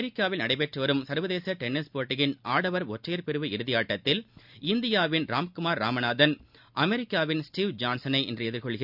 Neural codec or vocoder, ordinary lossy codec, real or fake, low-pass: none; none; real; 5.4 kHz